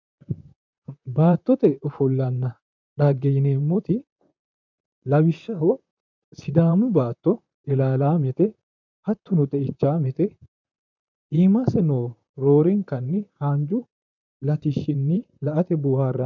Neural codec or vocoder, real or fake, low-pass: vocoder, 44.1 kHz, 128 mel bands, Pupu-Vocoder; fake; 7.2 kHz